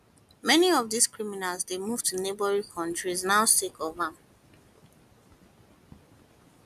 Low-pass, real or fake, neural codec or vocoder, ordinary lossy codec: 14.4 kHz; real; none; none